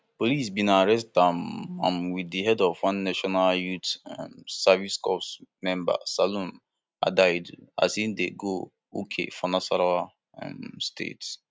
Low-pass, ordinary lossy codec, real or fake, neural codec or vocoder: none; none; real; none